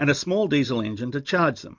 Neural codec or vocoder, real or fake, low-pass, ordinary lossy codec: none; real; 7.2 kHz; MP3, 64 kbps